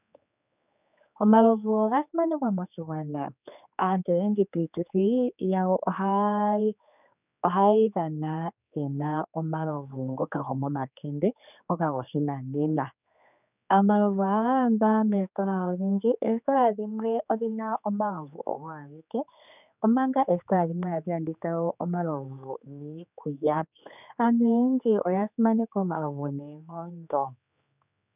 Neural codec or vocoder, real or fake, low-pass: codec, 16 kHz, 4 kbps, X-Codec, HuBERT features, trained on general audio; fake; 3.6 kHz